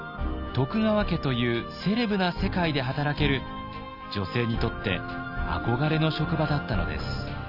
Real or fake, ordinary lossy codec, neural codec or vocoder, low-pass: real; none; none; 5.4 kHz